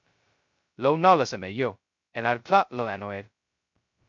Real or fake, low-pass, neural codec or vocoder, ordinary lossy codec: fake; 7.2 kHz; codec, 16 kHz, 0.2 kbps, FocalCodec; MP3, 48 kbps